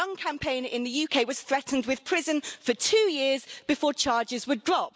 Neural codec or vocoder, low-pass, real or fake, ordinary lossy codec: none; none; real; none